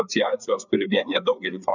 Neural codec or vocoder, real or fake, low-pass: codec, 16 kHz, 4 kbps, FreqCodec, larger model; fake; 7.2 kHz